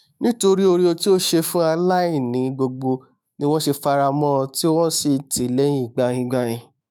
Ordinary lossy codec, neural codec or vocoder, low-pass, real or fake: none; autoencoder, 48 kHz, 128 numbers a frame, DAC-VAE, trained on Japanese speech; none; fake